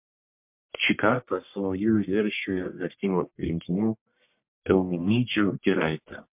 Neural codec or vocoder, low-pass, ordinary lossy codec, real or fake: codec, 44.1 kHz, 1.7 kbps, Pupu-Codec; 3.6 kHz; MP3, 32 kbps; fake